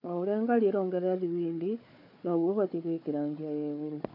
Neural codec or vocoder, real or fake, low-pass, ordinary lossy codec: codec, 16 kHz, 4 kbps, FunCodec, trained on Chinese and English, 50 frames a second; fake; 5.4 kHz; MP3, 32 kbps